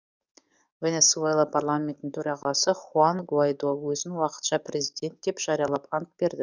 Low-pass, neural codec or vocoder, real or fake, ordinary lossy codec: 7.2 kHz; codec, 44.1 kHz, 7.8 kbps, DAC; fake; none